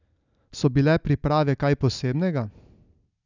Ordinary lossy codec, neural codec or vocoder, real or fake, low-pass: none; none; real; 7.2 kHz